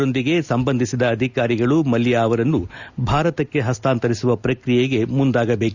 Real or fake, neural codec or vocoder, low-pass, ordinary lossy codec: real; none; 7.2 kHz; Opus, 64 kbps